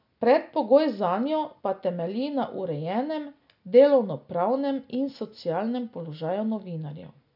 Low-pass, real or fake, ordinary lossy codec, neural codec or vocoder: 5.4 kHz; real; none; none